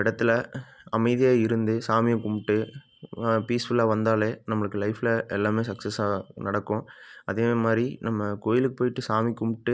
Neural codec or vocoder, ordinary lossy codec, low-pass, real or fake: none; none; none; real